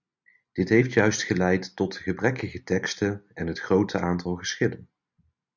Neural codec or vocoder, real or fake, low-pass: none; real; 7.2 kHz